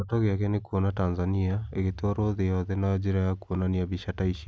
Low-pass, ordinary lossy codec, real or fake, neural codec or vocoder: none; none; real; none